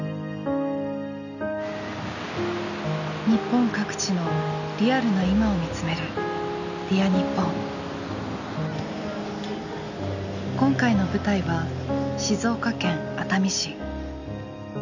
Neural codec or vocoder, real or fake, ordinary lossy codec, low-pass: none; real; none; 7.2 kHz